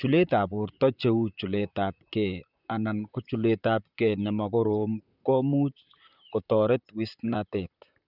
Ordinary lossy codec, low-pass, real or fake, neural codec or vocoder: none; 5.4 kHz; fake; vocoder, 44.1 kHz, 128 mel bands, Pupu-Vocoder